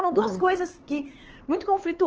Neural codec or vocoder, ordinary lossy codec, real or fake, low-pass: codec, 16 kHz, 4 kbps, X-Codec, HuBERT features, trained on LibriSpeech; Opus, 16 kbps; fake; 7.2 kHz